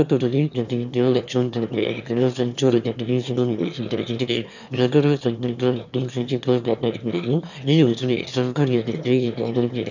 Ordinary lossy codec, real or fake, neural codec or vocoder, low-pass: none; fake; autoencoder, 22.05 kHz, a latent of 192 numbers a frame, VITS, trained on one speaker; 7.2 kHz